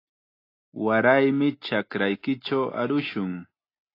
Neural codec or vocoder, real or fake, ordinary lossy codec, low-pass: none; real; AAC, 32 kbps; 5.4 kHz